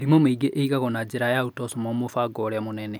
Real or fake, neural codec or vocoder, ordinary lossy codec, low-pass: real; none; none; none